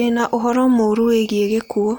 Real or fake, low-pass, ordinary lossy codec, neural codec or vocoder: real; none; none; none